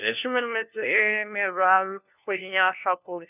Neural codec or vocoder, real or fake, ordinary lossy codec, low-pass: codec, 16 kHz, 1 kbps, FunCodec, trained on LibriTTS, 50 frames a second; fake; none; 3.6 kHz